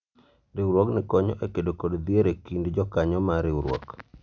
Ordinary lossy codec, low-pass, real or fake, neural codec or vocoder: none; 7.2 kHz; real; none